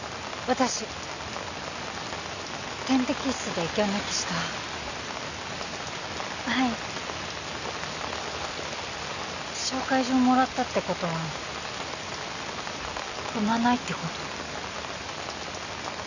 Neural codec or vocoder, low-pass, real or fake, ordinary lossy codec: none; 7.2 kHz; real; none